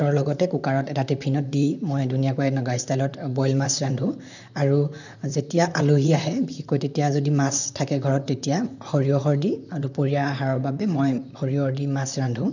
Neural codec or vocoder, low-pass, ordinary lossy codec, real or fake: vocoder, 44.1 kHz, 128 mel bands, Pupu-Vocoder; 7.2 kHz; none; fake